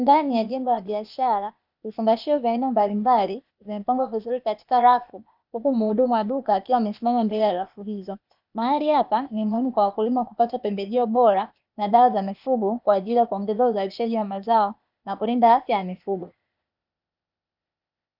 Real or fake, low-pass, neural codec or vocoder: fake; 5.4 kHz; codec, 16 kHz, 0.8 kbps, ZipCodec